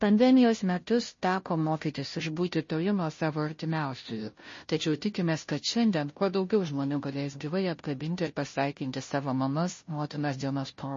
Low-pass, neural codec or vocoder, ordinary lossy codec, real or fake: 7.2 kHz; codec, 16 kHz, 0.5 kbps, FunCodec, trained on Chinese and English, 25 frames a second; MP3, 32 kbps; fake